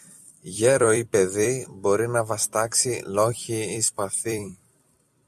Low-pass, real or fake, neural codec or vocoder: 14.4 kHz; fake; vocoder, 44.1 kHz, 128 mel bands every 256 samples, BigVGAN v2